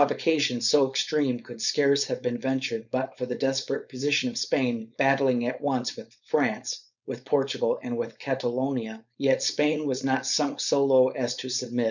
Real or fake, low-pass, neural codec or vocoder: fake; 7.2 kHz; codec, 16 kHz, 4.8 kbps, FACodec